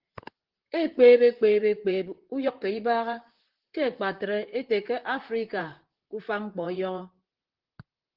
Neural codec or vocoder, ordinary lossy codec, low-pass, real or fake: vocoder, 44.1 kHz, 128 mel bands, Pupu-Vocoder; Opus, 16 kbps; 5.4 kHz; fake